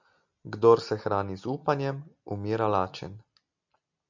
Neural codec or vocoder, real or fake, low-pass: none; real; 7.2 kHz